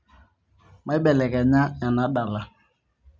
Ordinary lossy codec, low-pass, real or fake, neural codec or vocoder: none; none; real; none